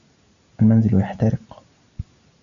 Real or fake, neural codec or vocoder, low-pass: real; none; 7.2 kHz